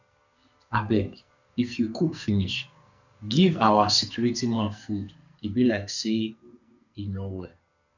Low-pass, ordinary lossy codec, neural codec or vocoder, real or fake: 7.2 kHz; none; codec, 44.1 kHz, 2.6 kbps, SNAC; fake